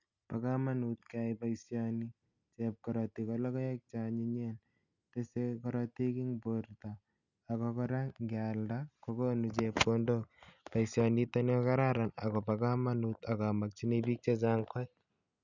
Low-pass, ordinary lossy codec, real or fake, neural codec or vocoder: 7.2 kHz; none; real; none